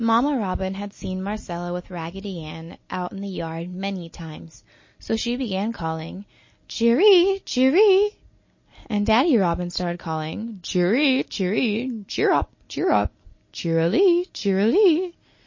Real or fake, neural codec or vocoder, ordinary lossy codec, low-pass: real; none; MP3, 32 kbps; 7.2 kHz